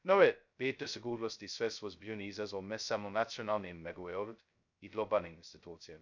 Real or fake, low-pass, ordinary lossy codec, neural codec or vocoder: fake; 7.2 kHz; none; codec, 16 kHz, 0.2 kbps, FocalCodec